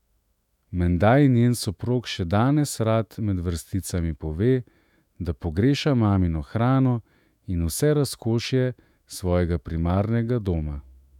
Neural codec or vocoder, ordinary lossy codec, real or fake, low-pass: autoencoder, 48 kHz, 128 numbers a frame, DAC-VAE, trained on Japanese speech; none; fake; 19.8 kHz